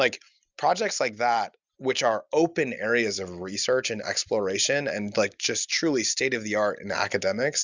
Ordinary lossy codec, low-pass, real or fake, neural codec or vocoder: Opus, 64 kbps; 7.2 kHz; real; none